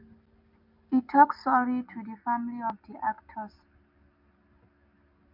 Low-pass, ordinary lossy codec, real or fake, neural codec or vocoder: 5.4 kHz; MP3, 48 kbps; real; none